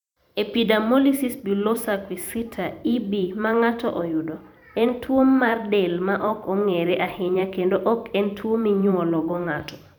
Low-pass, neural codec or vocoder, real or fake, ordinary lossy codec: 19.8 kHz; vocoder, 44.1 kHz, 128 mel bands every 512 samples, BigVGAN v2; fake; none